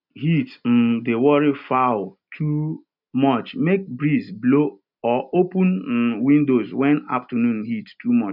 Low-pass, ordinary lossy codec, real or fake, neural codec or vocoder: 5.4 kHz; none; real; none